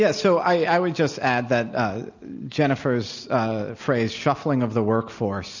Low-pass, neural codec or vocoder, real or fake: 7.2 kHz; none; real